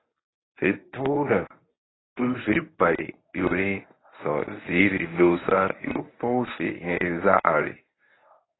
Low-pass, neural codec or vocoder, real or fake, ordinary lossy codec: 7.2 kHz; codec, 24 kHz, 0.9 kbps, WavTokenizer, medium speech release version 1; fake; AAC, 16 kbps